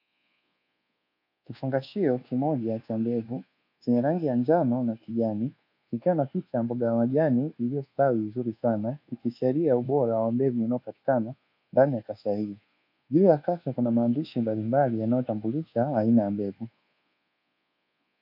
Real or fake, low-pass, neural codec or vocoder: fake; 5.4 kHz; codec, 24 kHz, 1.2 kbps, DualCodec